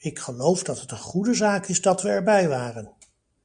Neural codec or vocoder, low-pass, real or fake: none; 9.9 kHz; real